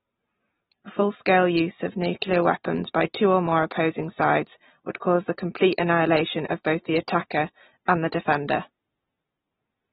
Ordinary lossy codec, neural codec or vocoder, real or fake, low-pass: AAC, 16 kbps; none; real; 7.2 kHz